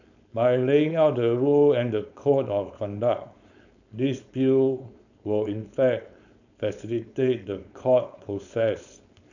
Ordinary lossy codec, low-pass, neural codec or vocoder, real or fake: none; 7.2 kHz; codec, 16 kHz, 4.8 kbps, FACodec; fake